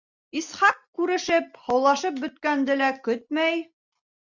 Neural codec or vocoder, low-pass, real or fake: none; 7.2 kHz; real